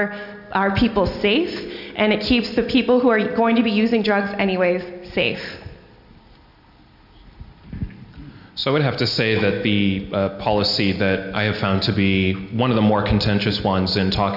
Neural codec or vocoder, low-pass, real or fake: none; 5.4 kHz; real